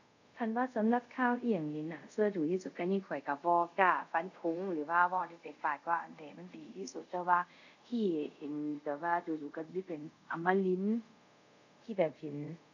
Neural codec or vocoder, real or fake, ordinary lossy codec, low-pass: codec, 24 kHz, 0.5 kbps, DualCodec; fake; none; 7.2 kHz